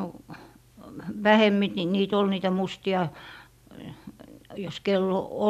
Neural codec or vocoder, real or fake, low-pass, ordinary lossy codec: none; real; 14.4 kHz; none